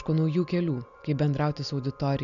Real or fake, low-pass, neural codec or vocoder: real; 7.2 kHz; none